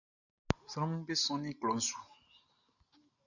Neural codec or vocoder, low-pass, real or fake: none; 7.2 kHz; real